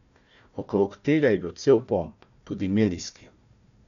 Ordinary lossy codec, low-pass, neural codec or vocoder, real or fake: none; 7.2 kHz; codec, 16 kHz, 1 kbps, FunCodec, trained on Chinese and English, 50 frames a second; fake